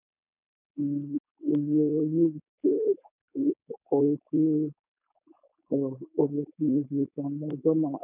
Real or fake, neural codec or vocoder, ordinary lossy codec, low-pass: fake; codec, 16 kHz, 4.8 kbps, FACodec; none; 3.6 kHz